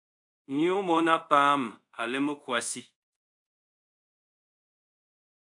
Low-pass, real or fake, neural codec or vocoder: 10.8 kHz; fake; codec, 24 kHz, 0.5 kbps, DualCodec